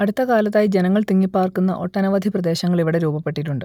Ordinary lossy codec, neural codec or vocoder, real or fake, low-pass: none; none; real; 19.8 kHz